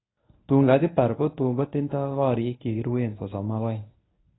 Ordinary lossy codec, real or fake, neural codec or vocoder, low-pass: AAC, 16 kbps; fake; codec, 24 kHz, 0.9 kbps, WavTokenizer, medium speech release version 1; 7.2 kHz